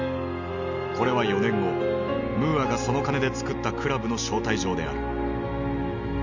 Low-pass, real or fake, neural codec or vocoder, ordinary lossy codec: 7.2 kHz; real; none; none